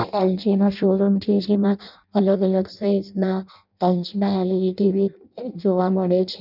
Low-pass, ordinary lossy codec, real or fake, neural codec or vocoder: 5.4 kHz; none; fake; codec, 16 kHz in and 24 kHz out, 0.6 kbps, FireRedTTS-2 codec